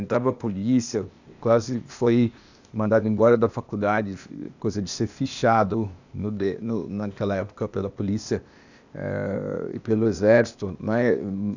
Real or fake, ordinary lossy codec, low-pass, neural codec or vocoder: fake; none; 7.2 kHz; codec, 16 kHz, 0.8 kbps, ZipCodec